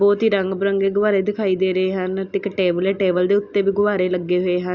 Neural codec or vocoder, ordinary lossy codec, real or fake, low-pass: none; Opus, 64 kbps; real; 7.2 kHz